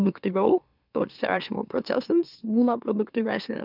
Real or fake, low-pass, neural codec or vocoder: fake; 5.4 kHz; autoencoder, 44.1 kHz, a latent of 192 numbers a frame, MeloTTS